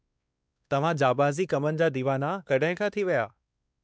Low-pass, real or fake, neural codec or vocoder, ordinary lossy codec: none; fake; codec, 16 kHz, 2 kbps, X-Codec, WavLM features, trained on Multilingual LibriSpeech; none